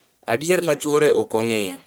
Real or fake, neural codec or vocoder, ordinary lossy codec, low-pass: fake; codec, 44.1 kHz, 1.7 kbps, Pupu-Codec; none; none